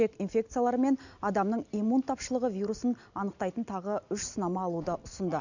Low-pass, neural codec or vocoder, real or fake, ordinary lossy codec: 7.2 kHz; none; real; none